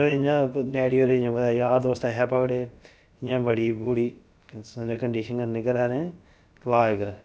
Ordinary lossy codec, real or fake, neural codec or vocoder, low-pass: none; fake; codec, 16 kHz, about 1 kbps, DyCAST, with the encoder's durations; none